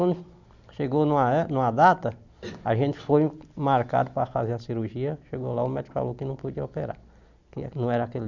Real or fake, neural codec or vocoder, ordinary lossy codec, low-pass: real; none; none; 7.2 kHz